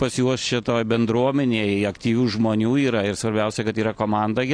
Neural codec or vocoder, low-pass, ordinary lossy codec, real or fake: none; 9.9 kHz; AAC, 48 kbps; real